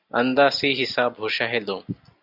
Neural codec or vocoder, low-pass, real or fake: none; 5.4 kHz; real